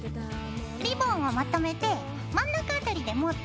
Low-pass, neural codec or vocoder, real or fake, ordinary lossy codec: none; none; real; none